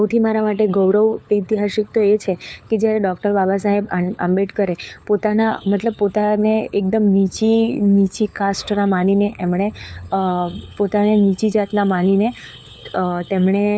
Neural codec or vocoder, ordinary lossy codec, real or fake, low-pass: codec, 16 kHz, 4 kbps, FunCodec, trained on LibriTTS, 50 frames a second; none; fake; none